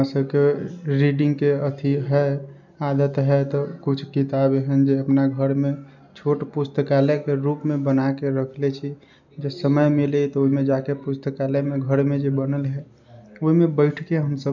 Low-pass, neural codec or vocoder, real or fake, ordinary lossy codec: 7.2 kHz; none; real; none